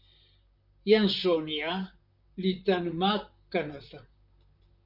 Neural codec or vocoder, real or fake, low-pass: vocoder, 44.1 kHz, 128 mel bands, Pupu-Vocoder; fake; 5.4 kHz